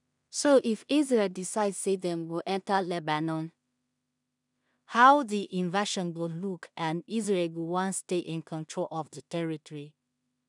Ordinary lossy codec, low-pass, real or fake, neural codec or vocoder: none; 10.8 kHz; fake; codec, 16 kHz in and 24 kHz out, 0.4 kbps, LongCat-Audio-Codec, two codebook decoder